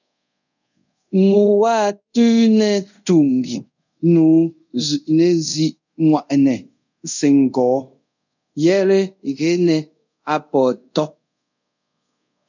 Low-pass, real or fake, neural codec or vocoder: 7.2 kHz; fake; codec, 24 kHz, 0.5 kbps, DualCodec